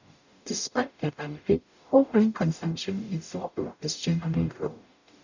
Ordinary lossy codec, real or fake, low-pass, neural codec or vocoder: none; fake; 7.2 kHz; codec, 44.1 kHz, 0.9 kbps, DAC